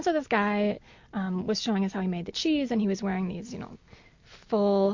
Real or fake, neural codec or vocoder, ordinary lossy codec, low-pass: real; none; MP3, 48 kbps; 7.2 kHz